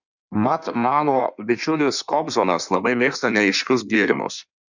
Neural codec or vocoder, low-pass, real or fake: codec, 16 kHz in and 24 kHz out, 1.1 kbps, FireRedTTS-2 codec; 7.2 kHz; fake